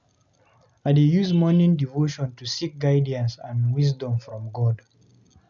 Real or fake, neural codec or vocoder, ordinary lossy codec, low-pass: real; none; none; 7.2 kHz